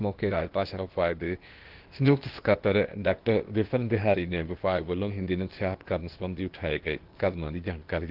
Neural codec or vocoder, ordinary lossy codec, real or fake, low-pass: codec, 16 kHz, 0.8 kbps, ZipCodec; Opus, 16 kbps; fake; 5.4 kHz